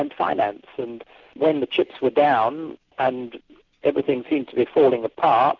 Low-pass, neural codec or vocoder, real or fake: 7.2 kHz; none; real